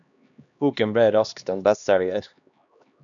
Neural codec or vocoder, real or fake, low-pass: codec, 16 kHz, 2 kbps, X-Codec, HuBERT features, trained on LibriSpeech; fake; 7.2 kHz